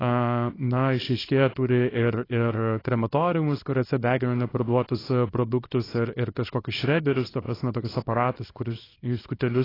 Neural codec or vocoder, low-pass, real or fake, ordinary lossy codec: codec, 24 kHz, 0.9 kbps, WavTokenizer, small release; 5.4 kHz; fake; AAC, 24 kbps